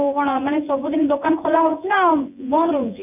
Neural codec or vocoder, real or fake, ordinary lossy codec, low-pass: vocoder, 24 kHz, 100 mel bands, Vocos; fake; none; 3.6 kHz